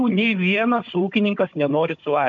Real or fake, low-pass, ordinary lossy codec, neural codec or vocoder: fake; 7.2 kHz; MP3, 48 kbps; codec, 16 kHz, 16 kbps, FunCodec, trained on Chinese and English, 50 frames a second